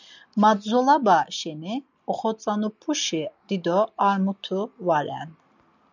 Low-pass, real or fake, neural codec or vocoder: 7.2 kHz; real; none